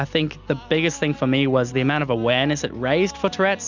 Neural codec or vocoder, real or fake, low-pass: none; real; 7.2 kHz